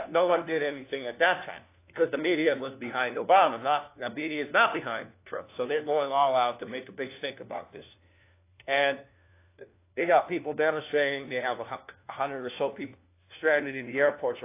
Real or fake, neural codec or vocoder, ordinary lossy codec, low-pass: fake; codec, 16 kHz, 1 kbps, FunCodec, trained on LibriTTS, 50 frames a second; AAC, 24 kbps; 3.6 kHz